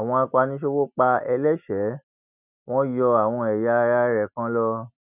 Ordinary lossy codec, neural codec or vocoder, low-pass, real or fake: none; none; 3.6 kHz; real